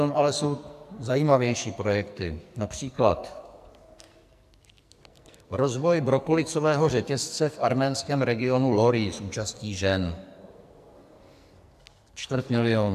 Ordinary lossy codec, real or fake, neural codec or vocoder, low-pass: AAC, 96 kbps; fake; codec, 44.1 kHz, 2.6 kbps, SNAC; 14.4 kHz